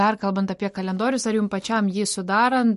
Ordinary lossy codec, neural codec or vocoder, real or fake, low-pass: MP3, 48 kbps; none; real; 14.4 kHz